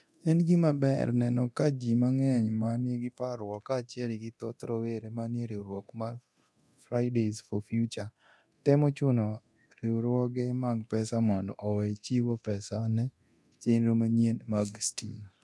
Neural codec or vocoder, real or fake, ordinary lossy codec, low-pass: codec, 24 kHz, 0.9 kbps, DualCodec; fake; none; none